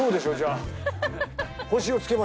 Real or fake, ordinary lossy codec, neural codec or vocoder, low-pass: real; none; none; none